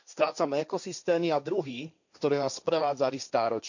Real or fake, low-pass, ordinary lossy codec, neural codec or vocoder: fake; 7.2 kHz; none; codec, 16 kHz, 1.1 kbps, Voila-Tokenizer